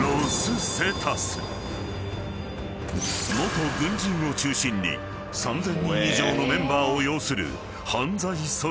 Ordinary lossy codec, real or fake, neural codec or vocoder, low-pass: none; real; none; none